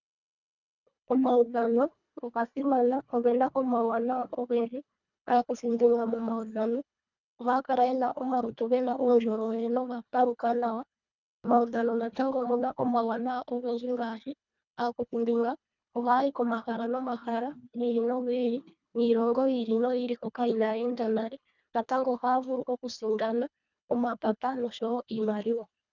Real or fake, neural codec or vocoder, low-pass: fake; codec, 24 kHz, 1.5 kbps, HILCodec; 7.2 kHz